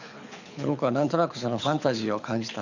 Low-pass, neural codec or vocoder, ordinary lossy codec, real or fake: 7.2 kHz; codec, 24 kHz, 6 kbps, HILCodec; none; fake